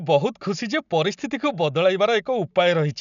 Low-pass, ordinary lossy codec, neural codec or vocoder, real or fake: 7.2 kHz; none; none; real